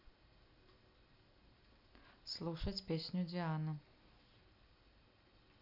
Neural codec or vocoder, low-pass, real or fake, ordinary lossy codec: none; 5.4 kHz; real; none